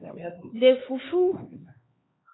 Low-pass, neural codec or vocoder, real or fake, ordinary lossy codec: 7.2 kHz; codec, 16 kHz, 2 kbps, X-Codec, HuBERT features, trained on LibriSpeech; fake; AAC, 16 kbps